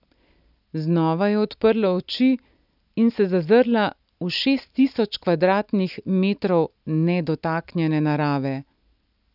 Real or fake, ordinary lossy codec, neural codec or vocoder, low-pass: real; none; none; 5.4 kHz